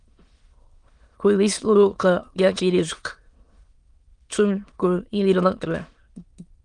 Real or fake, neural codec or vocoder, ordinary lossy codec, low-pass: fake; autoencoder, 22.05 kHz, a latent of 192 numbers a frame, VITS, trained on many speakers; Opus, 24 kbps; 9.9 kHz